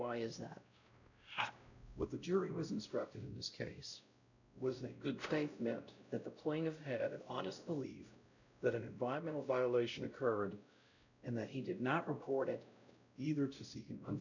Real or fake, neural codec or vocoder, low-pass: fake; codec, 16 kHz, 0.5 kbps, X-Codec, WavLM features, trained on Multilingual LibriSpeech; 7.2 kHz